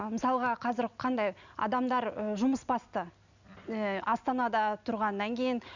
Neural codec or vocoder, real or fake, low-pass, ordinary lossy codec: none; real; 7.2 kHz; none